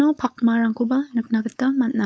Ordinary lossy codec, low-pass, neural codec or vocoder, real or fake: none; none; codec, 16 kHz, 4.8 kbps, FACodec; fake